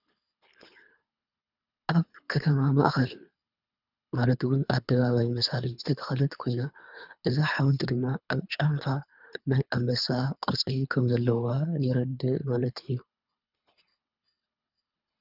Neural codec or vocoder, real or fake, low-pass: codec, 24 kHz, 3 kbps, HILCodec; fake; 5.4 kHz